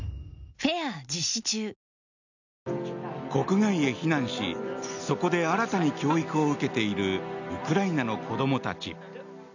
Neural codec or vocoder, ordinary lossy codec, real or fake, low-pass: none; none; real; 7.2 kHz